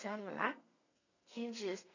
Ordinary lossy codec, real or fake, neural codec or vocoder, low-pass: none; fake; codec, 32 kHz, 1.9 kbps, SNAC; 7.2 kHz